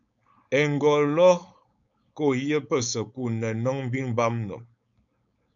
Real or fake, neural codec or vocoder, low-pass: fake; codec, 16 kHz, 4.8 kbps, FACodec; 7.2 kHz